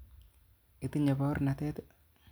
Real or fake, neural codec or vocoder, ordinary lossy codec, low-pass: real; none; none; none